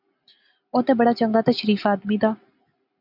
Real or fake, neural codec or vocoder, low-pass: real; none; 5.4 kHz